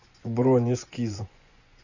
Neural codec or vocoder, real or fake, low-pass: vocoder, 24 kHz, 100 mel bands, Vocos; fake; 7.2 kHz